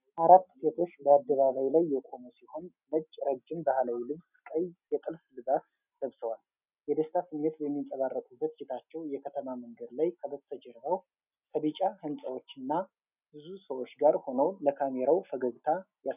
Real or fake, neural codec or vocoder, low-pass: real; none; 3.6 kHz